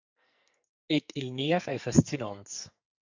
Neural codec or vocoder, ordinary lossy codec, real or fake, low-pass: codec, 44.1 kHz, 2.6 kbps, SNAC; MP3, 64 kbps; fake; 7.2 kHz